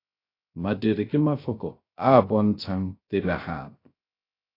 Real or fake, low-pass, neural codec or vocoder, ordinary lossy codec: fake; 5.4 kHz; codec, 16 kHz, 0.3 kbps, FocalCodec; AAC, 24 kbps